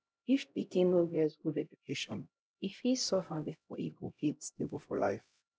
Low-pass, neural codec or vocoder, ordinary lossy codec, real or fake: none; codec, 16 kHz, 0.5 kbps, X-Codec, HuBERT features, trained on LibriSpeech; none; fake